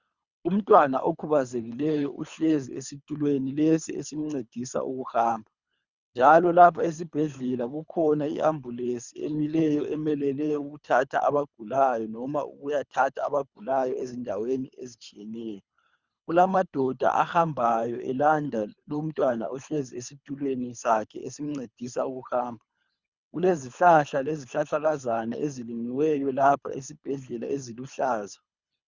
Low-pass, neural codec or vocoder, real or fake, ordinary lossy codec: 7.2 kHz; codec, 24 kHz, 3 kbps, HILCodec; fake; Opus, 64 kbps